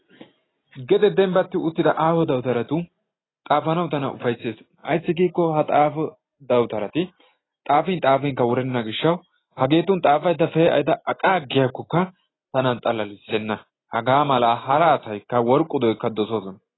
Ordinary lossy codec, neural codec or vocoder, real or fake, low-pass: AAC, 16 kbps; none; real; 7.2 kHz